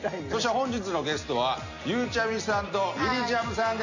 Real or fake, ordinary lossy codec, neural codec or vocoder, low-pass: real; none; none; 7.2 kHz